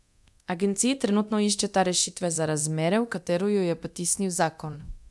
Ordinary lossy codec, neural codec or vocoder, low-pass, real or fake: none; codec, 24 kHz, 0.9 kbps, DualCodec; none; fake